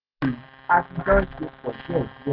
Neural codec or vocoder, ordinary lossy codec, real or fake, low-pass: none; none; real; 5.4 kHz